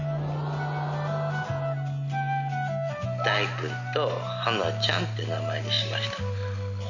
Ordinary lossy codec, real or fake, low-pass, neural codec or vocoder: none; real; 7.2 kHz; none